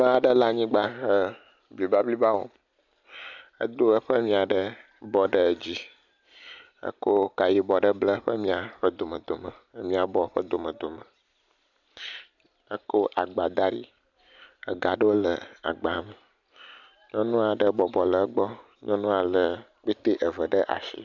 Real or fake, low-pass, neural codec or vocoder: real; 7.2 kHz; none